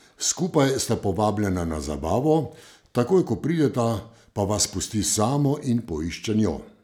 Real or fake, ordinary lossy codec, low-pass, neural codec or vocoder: real; none; none; none